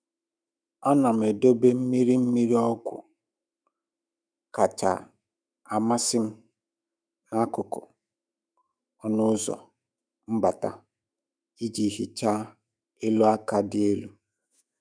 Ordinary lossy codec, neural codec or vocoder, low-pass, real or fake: none; autoencoder, 48 kHz, 128 numbers a frame, DAC-VAE, trained on Japanese speech; 9.9 kHz; fake